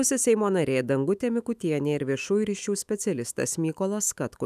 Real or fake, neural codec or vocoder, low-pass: fake; autoencoder, 48 kHz, 128 numbers a frame, DAC-VAE, trained on Japanese speech; 14.4 kHz